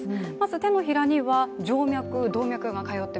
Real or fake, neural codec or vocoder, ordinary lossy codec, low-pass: real; none; none; none